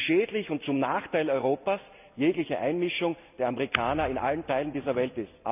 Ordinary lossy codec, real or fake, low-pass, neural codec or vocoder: none; real; 3.6 kHz; none